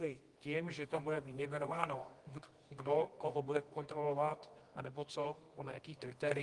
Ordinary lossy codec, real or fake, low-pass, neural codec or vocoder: Opus, 24 kbps; fake; 10.8 kHz; codec, 24 kHz, 0.9 kbps, WavTokenizer, medium music audio release